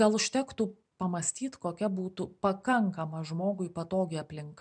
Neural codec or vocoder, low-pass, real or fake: none; 9.9 kHz; real